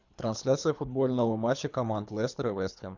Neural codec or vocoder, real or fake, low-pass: codec, 24 kHz, 3 kbps, HILCodec; fake; 7.2 kHz